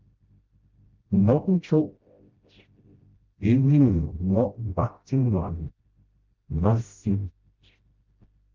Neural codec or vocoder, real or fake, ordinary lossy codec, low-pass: codec, 16 kHz, 0.5 kbps, FreqCodec, smaller model; fake; Opus, 32 kbps; 7.2 kHz